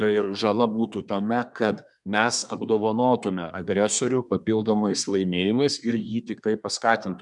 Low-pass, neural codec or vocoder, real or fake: 10.8 kHz; codec, 24 kHz, 1 kbps, SNAC; fake